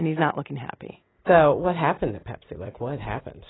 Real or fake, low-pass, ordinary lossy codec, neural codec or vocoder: real; 7.2 kHz; AAC, 16 kbps; none